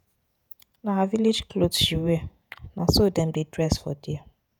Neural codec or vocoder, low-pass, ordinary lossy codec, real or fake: vocoder, 48 kHz, 128 mel bands, Vocos; none; none; fake